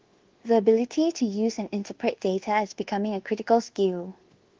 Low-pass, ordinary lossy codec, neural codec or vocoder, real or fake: 7.2 kHz; Opus, 16 kbps; codec, 24 kHz, 1.2 kbps, DualCodec; fake